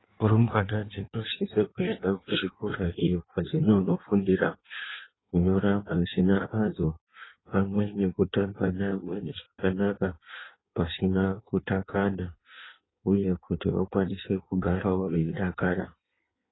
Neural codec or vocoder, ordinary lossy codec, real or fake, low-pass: codec, 16 kHz in and 24 kHz out, 1.1 kbps, FireRedTTS-2 codec; AAC, 16 kbps; fake; 7.2 kHz